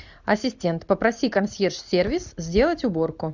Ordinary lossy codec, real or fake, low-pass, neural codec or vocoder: Opus, 64 kbps; real; 7.2 kHz; none